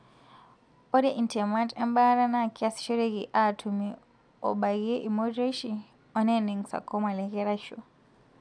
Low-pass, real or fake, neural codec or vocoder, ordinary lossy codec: 9.9 kHz; real; none; none